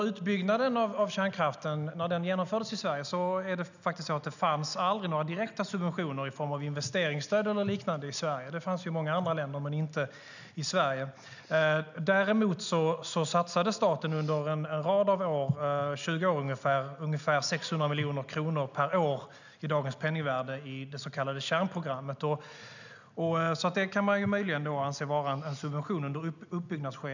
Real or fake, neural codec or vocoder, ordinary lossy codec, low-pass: real; none; none; 7.2 kHz